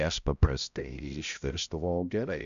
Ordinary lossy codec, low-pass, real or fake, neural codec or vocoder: MP3, 96 kbps; 7.2 kHz; fake; codec, 16 kHz, 0.5 kbps, X-Codec, HuBERT features, trained on balanced general audio